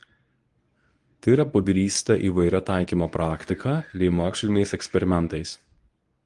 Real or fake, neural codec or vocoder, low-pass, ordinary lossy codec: fake; codec, 24 kHz, 0.9 kbps, WavTokenizer, medium speech release version 1; 10.8 kHz; Opus, 32 kbps